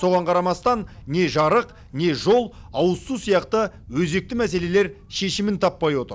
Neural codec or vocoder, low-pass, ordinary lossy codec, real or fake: none; none; none; real